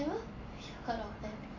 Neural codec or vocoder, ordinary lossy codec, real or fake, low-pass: none; none; real; 7.2 kHz